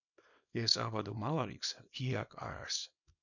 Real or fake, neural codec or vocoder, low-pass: fake; codec, 24 kHz, 0.9 kbps, WavTokenizer, small release; 7.2 kHz